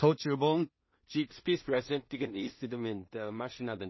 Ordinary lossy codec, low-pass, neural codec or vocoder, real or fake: MP3, 24 kbps; 7.2 kHz; codec, 16 kHz in and 24 kHz out, 0.4 kbps, LongCat-Audio-Codec, two codebook decoder; fake